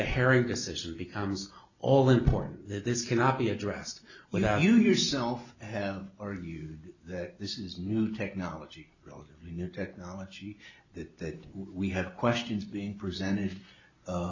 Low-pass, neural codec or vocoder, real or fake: 7.2 kHz; none; real